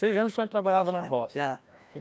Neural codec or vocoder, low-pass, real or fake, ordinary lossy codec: codec, 16 kHz, 1 kbps, FreqCodec, larger model; none; fake; none